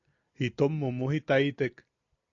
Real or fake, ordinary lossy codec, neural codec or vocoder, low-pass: real; AAC, 48 kbps; none; 7.2 kHz